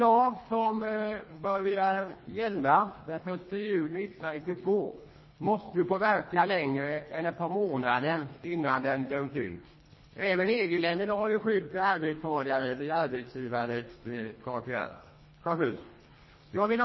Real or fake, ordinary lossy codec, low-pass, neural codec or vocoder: fake; MP3, 24 kbps; 7.2 kHz; codec, 24 kHz, 1.5 kbps, HILCodec